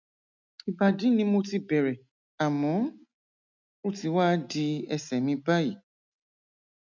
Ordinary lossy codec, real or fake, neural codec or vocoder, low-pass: none; real; none; 7.2 kHz